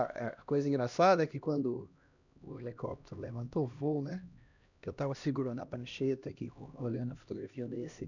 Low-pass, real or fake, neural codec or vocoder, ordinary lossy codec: 7.2 kHz; fake; codec, 16 kHz, 1 kbps, X-Codec, HuBERT features, trained on LibriSpeech; none